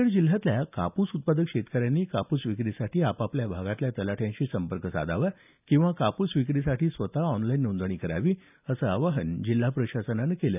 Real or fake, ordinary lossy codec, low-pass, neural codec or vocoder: real; none; 3.6 kHz; none